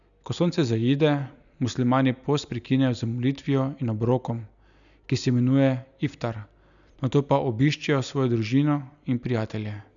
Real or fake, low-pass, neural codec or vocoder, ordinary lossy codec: real; 7.2 kHz; none; none